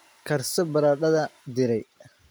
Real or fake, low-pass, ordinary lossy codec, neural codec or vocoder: real; none; none; none